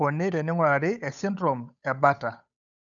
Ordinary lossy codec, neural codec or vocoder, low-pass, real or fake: none; codec, 16 kHz, 8 kbps, FunCodec, trained on Chinese and English, 25 frames a second; 7.2 kHz; fake